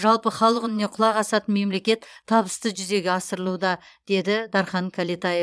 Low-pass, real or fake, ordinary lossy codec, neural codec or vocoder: none; fake; none; vocoder, 22.05 kHz, 80 mel bands, Vocos